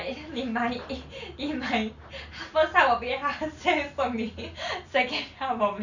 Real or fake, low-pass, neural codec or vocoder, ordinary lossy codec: real; 7.2 kHz; none; none